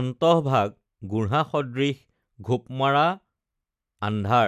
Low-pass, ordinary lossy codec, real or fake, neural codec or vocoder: 14.4 kHz; none; real; none